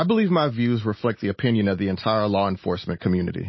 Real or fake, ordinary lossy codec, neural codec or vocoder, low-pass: real; MP3, 24 kbps; none; 7.2 kHz